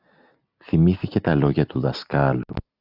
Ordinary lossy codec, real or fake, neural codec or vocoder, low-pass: AAC, 48 kbps; real; none; 5.4 kHz